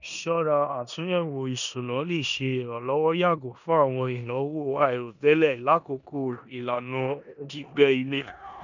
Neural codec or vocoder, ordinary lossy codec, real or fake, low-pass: codec, 16 kHz in and 24 kHz out, 0.9 kbps, LongCat-Audio-Codec, four codebook decoder; none; fake; 7.2 kHz